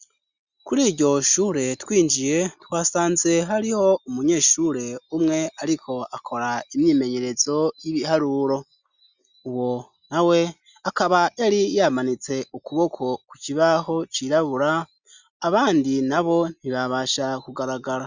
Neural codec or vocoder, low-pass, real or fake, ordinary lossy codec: none; 7.2 kHz; real; Opus, 64 kbps